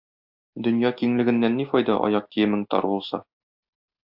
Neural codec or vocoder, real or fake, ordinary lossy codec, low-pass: none; real; AAC, 48 kbps; 5.4 kHz